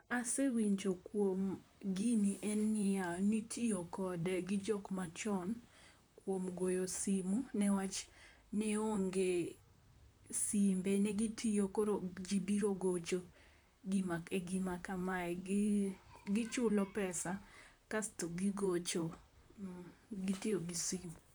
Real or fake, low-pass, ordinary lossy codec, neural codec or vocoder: fake; none; none; vocoder, 44.1 kHz, 128 mel bands, Pupu-Vocoder